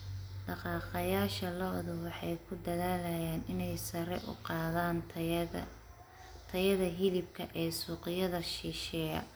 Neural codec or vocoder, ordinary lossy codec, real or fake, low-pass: none; none; real; none